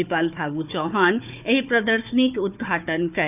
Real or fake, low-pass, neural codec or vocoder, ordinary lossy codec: fake; 3.6 kHz; codec, 16 kHz, 4 kbps, FunCodec, trained on Chinese and English, 50 frames a second; none